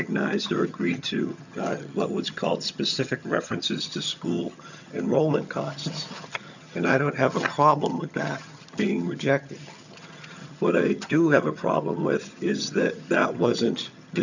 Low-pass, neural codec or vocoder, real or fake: 7.2 kHz; vocoder, 22.05 kHz, 80 mel bands, HiFi-GAN; fake